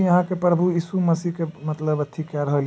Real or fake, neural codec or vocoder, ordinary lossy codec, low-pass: real; none; none; none